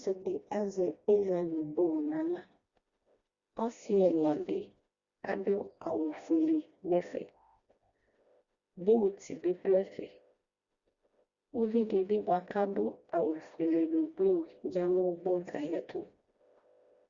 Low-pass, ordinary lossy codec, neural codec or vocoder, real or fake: 7.2 kHz; Opus, 64 kbps; codec, 16 kHz, 1 kbps, FreqCodec, smaller model; fake